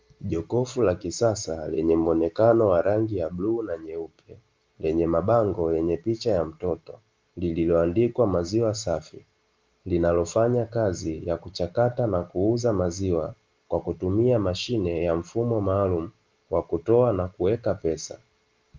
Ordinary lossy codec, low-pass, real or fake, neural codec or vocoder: Opus, 32 kbps; 7.2 kHz; real; none